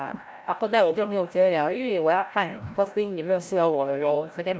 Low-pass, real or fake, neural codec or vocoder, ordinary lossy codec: none; fake; codec, 16 kHz, 0.5 kbps, FreqCodec, larger model; none